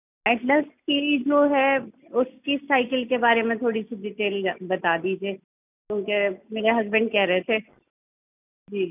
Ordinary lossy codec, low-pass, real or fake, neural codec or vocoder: none; 3.6 kHz; real; none